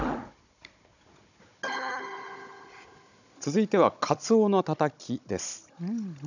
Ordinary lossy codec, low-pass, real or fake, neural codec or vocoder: none; 7.2 kHz; fake; codec, 16 kHz, 16 kbps, FunCodec, trained on Chinese and English, 50 frames a second